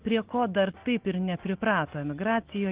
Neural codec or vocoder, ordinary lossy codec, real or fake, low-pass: none; Opus, 16 kbps; real; 3.6 kHz